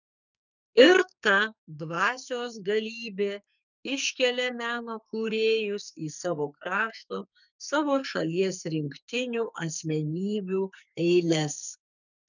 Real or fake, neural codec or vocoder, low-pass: fake; codec, 44.1 kHz, 2.6 kbps, SNAC; 7.2 kHz